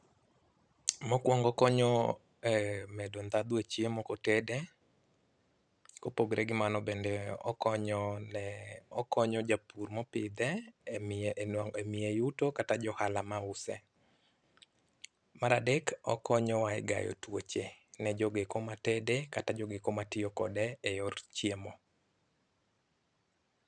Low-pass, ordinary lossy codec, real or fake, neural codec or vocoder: 9.9 kHz; none; fake; vocoder, 44.1 kHz, 128 mel bands every 512 samples, BigVGAN v2